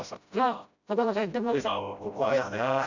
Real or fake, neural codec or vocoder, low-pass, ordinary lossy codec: fake; codec, 16 kHz, 0.5 kbps, FreqCodec, smaller model; 7.2 kHz; none